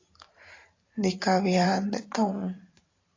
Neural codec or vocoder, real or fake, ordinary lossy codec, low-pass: vocoder, 44.1 kHz, 128 mel bands every 512 samples, BigVGAN v2; fake; AAC, 32 kbps; 7.2 kHz